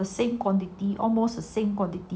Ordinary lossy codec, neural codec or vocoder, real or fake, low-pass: none; none; real; none